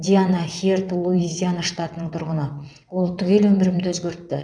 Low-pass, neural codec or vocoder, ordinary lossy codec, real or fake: 9.9 kHz; vocoder, 22.05 kHz, 80 mel bands, WaveNeXt; none; fake